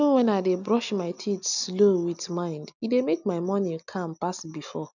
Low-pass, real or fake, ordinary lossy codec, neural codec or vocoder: 7.2 kHz; real; none; none